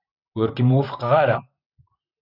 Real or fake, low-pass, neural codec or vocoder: fake; 5.4 kHz; vocoder, 44.1 kHz, 128 mel bands, Pupu-Vocoder